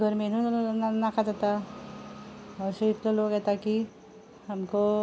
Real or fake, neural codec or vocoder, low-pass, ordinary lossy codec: real; none; none; none